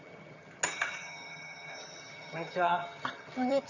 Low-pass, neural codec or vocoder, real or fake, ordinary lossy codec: 7.2 kHz; vocoder, 22.05 kHz, 80 mel bands, HiFi-GAN; fake; none